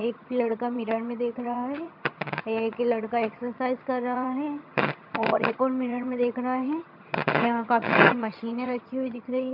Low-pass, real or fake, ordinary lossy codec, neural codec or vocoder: 5.4 kHz; fake; MP3, 48 kbps; vocoder, 22.05 kHz, 80 mel bands, HiFi-GAN